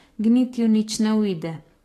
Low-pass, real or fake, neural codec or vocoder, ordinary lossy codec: 14.4 kHz; fake; codec, 44.1 kHz, 7.8 kbps, DAC; AAC, 48 kbps